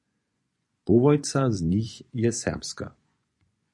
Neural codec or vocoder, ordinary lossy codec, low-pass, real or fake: vocoder, 24 kHz, 100 mel bands, Vocos; MP3, 64 kbps; 10.8 kHz; fake